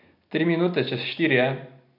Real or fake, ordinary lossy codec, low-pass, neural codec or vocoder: real; none; 5.4 kHz; none